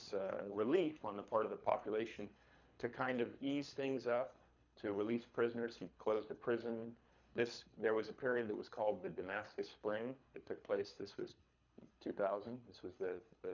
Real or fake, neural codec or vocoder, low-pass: fake; codec, 24 kHz, 3 kbps, HILCodec; 7.2 kHz